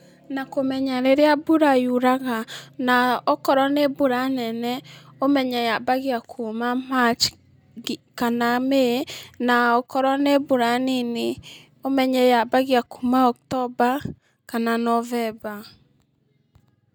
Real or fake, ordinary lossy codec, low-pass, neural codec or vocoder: real; none; none; none